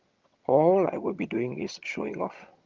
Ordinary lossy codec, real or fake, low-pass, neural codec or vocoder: Opus, 32 kbps; fake; 7.2 kHz; vocoder, 22.05 kHz, 80 mel bands, HiFi-GAN